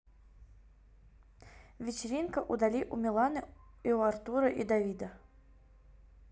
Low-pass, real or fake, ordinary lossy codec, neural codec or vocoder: none; real; none; none